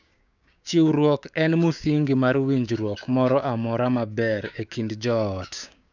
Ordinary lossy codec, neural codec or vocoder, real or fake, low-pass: none; codec, 16 kHz, 6 kbps, DAC; fake; 7.2 kHz